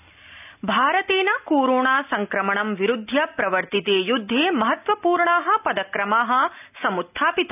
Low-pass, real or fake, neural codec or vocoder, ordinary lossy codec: 3.6 kHz; real; none; none